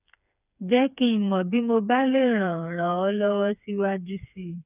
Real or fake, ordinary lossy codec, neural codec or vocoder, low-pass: fake; none; codec, 16 kHz, 4 kbps, FreqCodec, smaller model; 3.6 kHz